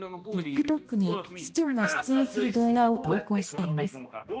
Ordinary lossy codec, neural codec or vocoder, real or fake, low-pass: none; codec, 16 kHz, 1 kbps, X-Codec, HuBERT features, trained on balanced general audio; fake; none